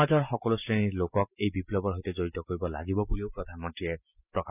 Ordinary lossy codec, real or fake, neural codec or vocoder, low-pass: none; real; none; 3.6 kHz